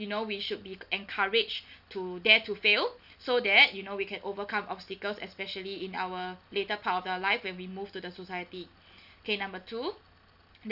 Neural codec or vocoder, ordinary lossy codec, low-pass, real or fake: none; none; 5.4 kHz; real